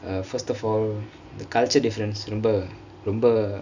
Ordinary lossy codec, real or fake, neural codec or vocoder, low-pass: none; real; none; 7.2 kHz